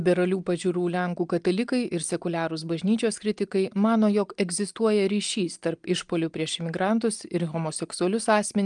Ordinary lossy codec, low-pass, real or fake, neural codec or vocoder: Opus, 32 kbps; 9.9 kHz; real; none